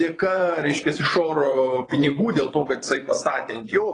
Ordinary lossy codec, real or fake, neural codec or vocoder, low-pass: AAC, 32 kbps; fake; vocoder, 22.05 kHz, 80 mel bands, WaveNeXt; 9.9 kHz